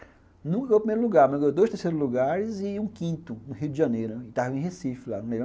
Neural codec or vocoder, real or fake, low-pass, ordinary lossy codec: none; real; none; none